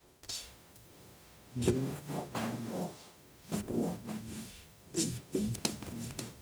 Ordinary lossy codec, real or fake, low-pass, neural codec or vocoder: none; fake; none; codec, 44.1 kHz, 0.9 kbps, DAC